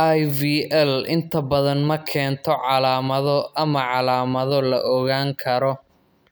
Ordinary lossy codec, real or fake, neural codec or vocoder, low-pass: none; real; none; none